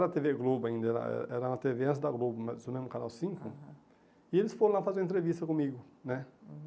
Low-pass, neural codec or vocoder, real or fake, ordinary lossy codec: none; none; real; none